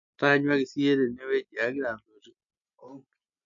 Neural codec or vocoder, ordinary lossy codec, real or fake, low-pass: none; MP3, 48 kbps; real; 7.2 kHz